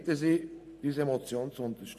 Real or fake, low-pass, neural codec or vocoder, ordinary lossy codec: real; 14.4 kHz; none; none